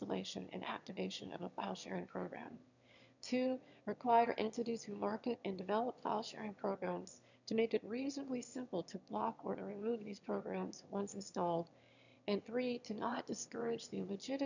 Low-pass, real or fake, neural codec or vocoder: 7.2 kHz; fake; autoencoder, 22.05 kHz, a latent of 192 numbers a frame, VITS, trained on one speaker